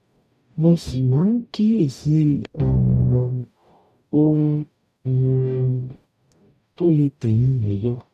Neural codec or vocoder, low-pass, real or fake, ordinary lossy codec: codec, 44.1 kHz, 0.9 kbps, DAC; 14.4 kHz; fake; none